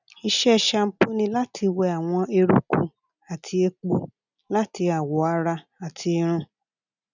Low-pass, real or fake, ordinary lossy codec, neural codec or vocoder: 7.2 kHz; real; none; none